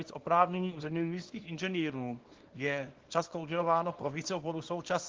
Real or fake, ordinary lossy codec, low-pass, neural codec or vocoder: fake; Opus, 16 kbps; 7.2 kHz; codec, 24 kHz, 0.9 kbps, WavTokenizer, small release